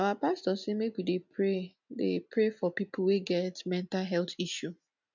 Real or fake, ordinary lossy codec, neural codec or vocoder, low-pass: real; none; none; 7.2 kHz